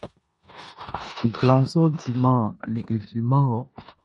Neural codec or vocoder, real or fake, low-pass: codec, 16 kHz in and 24 kHz out, 0.9 kbps, LongCat-Audio-Codec, four codebook decoder; fake; 10.8 kHz